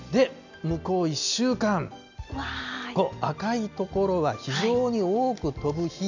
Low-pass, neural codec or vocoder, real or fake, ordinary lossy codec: 7.2 kHz; none; real; none